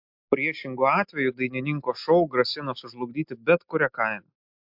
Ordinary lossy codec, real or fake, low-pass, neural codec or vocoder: MP3, 48 kbps; real; 5.4 kHz; none